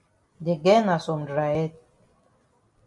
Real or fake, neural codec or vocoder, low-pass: real; none; 10.8 kHz